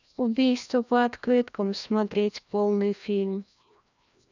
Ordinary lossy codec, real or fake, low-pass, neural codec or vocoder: none; fake; 7.2 kHz; codec, 16 kHz, 1 kbps, FreqCodec, larger model